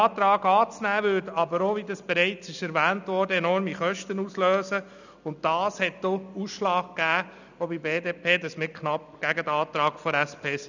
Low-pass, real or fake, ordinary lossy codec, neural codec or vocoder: 7.2 kHz; real; none; none